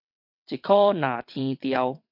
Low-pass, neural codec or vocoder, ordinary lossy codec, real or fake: 5.4 kHz; vocoder, 22.05 kHz, 80 mel bands, WaveNeXt; MP3, 48 kbps; fake